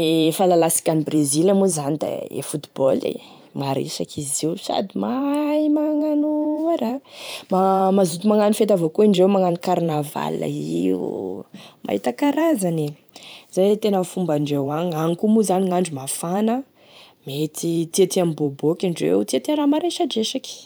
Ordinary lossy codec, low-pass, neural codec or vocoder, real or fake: none; none; vocoder, 44.1 kHz, 128 mel bands every 512 samples, BigVGAN v2; fake